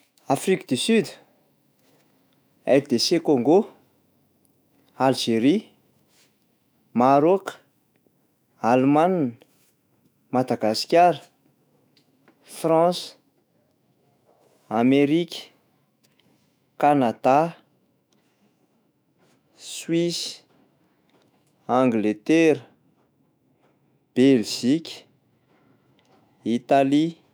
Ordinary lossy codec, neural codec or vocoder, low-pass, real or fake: none; autoencoder, 48 kHz, 128 numbers a frame, DAC-VAE, trained on Japanese speech; none; fake